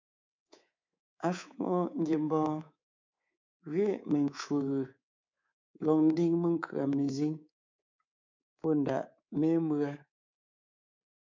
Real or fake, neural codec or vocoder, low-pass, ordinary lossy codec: fake; codec, 24 kHz, 3.1 kbps, DualCodec; 7.2 kHz; MP3, 64 kbps